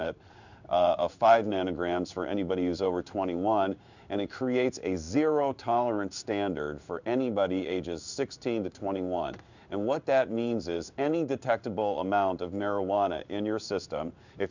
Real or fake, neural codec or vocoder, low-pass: fake; codec, 16 kHz in and 24 kHz out, 1 kbps, XY-Tokenizer; 7.2 kHz